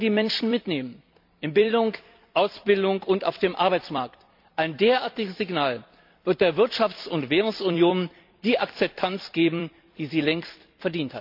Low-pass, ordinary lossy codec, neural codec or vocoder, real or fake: 5.4 kHz; MP3, 48 kbps; vocoder, 44.1 kHz, 128 mel bands every 256 samples, BigVGAN v2; fake